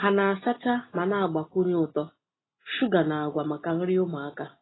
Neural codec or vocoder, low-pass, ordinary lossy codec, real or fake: none; 7.2 kHz; AAC, 16 kbps; real